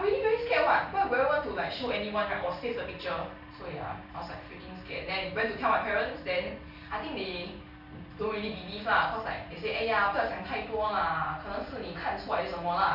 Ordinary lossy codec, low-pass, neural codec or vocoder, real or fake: none; 5.4 kHz; none; real